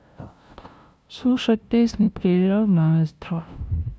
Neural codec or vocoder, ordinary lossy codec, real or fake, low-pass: codec, 16 kHz, 0.5 kbps, FunCodec, trained on LibriTTS, 25 frames a second; none; fake; none